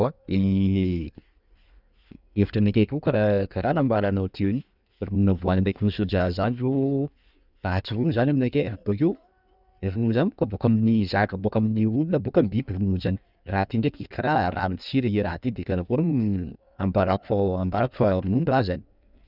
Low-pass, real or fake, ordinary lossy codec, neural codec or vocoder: 5.4 kHz; fake; none; codec, 16 kHz in and 24 kHz out, 1.1 kbps, FireRedTTS-2 codec